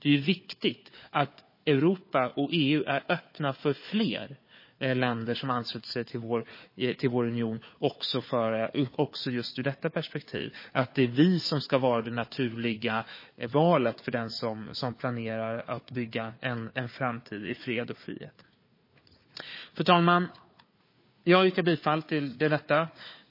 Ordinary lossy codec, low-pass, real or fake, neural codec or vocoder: MP3, 24 kbps; 5.4 kHz; fake; codec, 16 kHz, 4 kbps, FunCodec, trained on Chinese and English, 50 frames a second